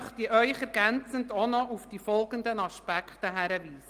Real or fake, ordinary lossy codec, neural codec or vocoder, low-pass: real; Opus, 24 kbps; none; 14.4 kHz